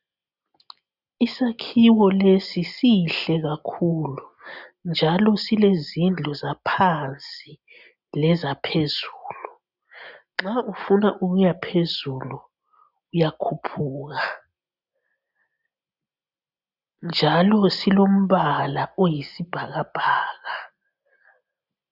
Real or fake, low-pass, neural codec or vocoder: real; 5.4 kHz; none